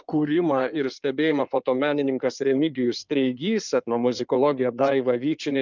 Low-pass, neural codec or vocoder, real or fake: 7.2 kHz; codec, 16 kHz in and 24 kHz out, 2.2 kbps, FireRedTTS-2 codec; fake